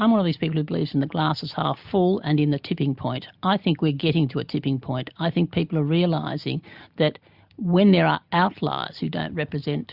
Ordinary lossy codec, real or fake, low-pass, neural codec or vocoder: Opus, 64 kbps; real; 5.4 kHz; none